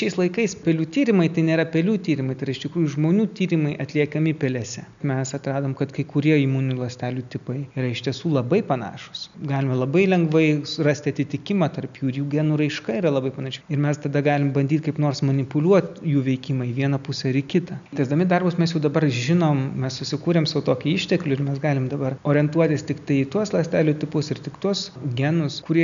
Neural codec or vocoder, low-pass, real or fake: none; 7.2 kHz; real